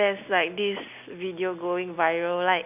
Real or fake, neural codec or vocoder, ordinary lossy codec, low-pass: real; none; none; 3.6 kHz